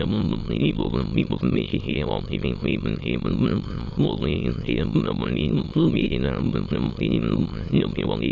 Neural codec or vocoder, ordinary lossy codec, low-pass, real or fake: autoencoder, 22.05 kHz, a latent of 192 numbers a frame, VITS, trained on many speakers; AAC, 48 kbps; 7.2 kHz; fake